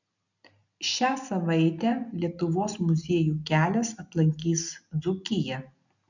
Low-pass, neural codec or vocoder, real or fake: 7.2 kHz; none; real